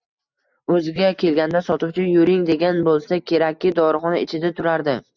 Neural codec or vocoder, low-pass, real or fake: none; 7.2 kHz; real